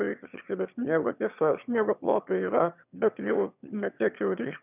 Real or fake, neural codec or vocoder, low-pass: fake; autoencoder, 22.05 kHz, a latent of 192 numbers a frame, VITS, trained on one speaker; 3.6 kHz